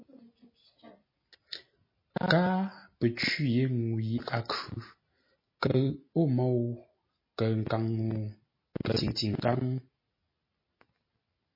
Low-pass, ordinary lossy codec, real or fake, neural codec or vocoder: 5.4 kHz; MP3, 24 kbps; real; none